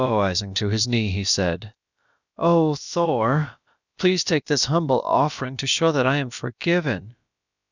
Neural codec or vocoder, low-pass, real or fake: codec, 16 kHz, about 1 kbps, DyCAST, with the encoder's durations; 7.2 kHz; fake